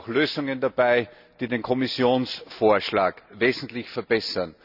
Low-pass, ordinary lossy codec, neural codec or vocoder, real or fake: 5.4 kHz; none; none; real